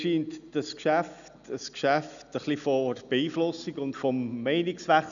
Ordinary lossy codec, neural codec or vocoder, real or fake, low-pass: none; none; real; 7.2 kHz